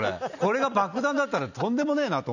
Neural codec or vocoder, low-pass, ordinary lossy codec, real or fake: none; 7.2 kHz; none; real